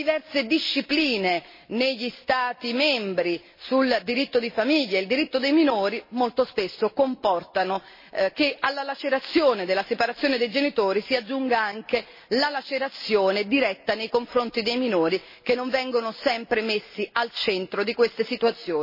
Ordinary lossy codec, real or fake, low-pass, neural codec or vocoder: MP3, 24 kbps; real; 5.4 kHz; none